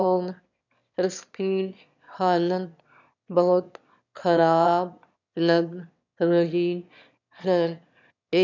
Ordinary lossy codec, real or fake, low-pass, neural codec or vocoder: none; fake; 7.2 kHz; autoencoder, 22.05 kHz, a latent of 192 numbers a frame, VITS, trained on one speaker